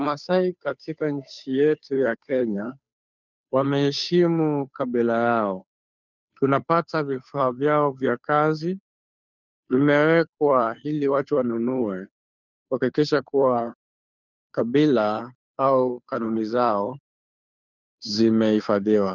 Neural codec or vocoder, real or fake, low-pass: codec, 16 kHz, 2 kbps, FunCodec, trained on Chinese and English, 25 frames a second; fake; 7.2 kHz